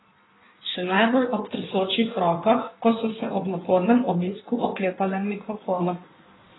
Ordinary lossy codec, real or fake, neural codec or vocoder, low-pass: AAC, 16 kbps; fake; codec, 16 kHz in and 24 kHz out, 1.1 kbps, FireRedTTS-2 codec; 7.2 kHz